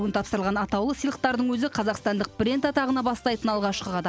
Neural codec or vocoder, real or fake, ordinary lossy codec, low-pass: none; real; none; none